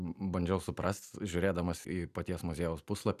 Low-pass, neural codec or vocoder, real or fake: 14.4 kHz; none; real